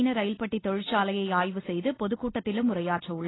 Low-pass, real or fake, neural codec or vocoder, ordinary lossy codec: 7.2 kHz; real; none; AAC, 16 kbps